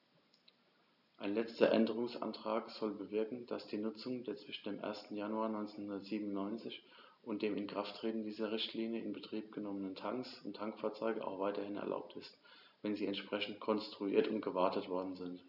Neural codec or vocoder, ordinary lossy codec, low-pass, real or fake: none; none; 5.4 kHz; real